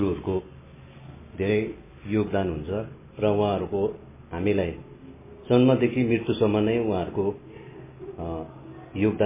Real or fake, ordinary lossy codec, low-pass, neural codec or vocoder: real; MP3, 16 kbps; 3.6 kHz; none